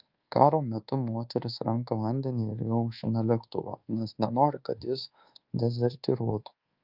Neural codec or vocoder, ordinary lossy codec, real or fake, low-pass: codec, 24 kHz, 1.2 kbps, DualCodec; Opus, 24 kbps; fake; 5.4 kHz